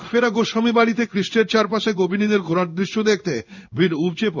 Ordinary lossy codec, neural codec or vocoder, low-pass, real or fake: none; codec, 16 kHz in and 24 kHz out, 1 kbps, XY-Tokenizer; 7.2 kHz; fake